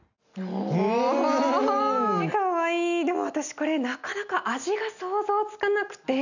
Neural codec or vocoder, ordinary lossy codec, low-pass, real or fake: none; none; 7.2 kHz; real